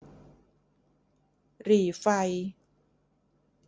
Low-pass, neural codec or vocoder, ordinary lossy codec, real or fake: none; none; none; real